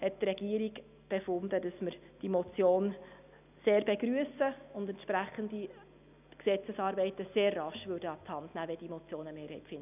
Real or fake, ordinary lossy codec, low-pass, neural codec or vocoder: real; none; 3.6 kHz; none